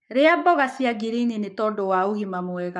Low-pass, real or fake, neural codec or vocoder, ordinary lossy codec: 10.8 kHz; fake; codec, 44.1 kHz, 7.8 kbps, Pupu-Codec; none